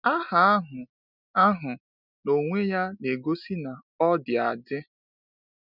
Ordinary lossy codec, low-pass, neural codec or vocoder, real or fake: none; 5.4 kHz; none; real